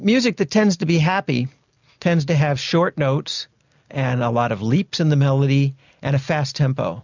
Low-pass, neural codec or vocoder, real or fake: 7.2 kHz; none; real